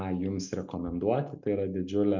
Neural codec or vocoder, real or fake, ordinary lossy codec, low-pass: none; real; MP3, 64 kbps; 7.2 kHz